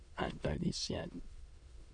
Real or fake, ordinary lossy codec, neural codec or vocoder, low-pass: fake; Opus, 64 kbps; autoencoder, 22.05 kHz, a latent of 192 numbers a frame, VITS, trained on many speakers; 9.9 kHz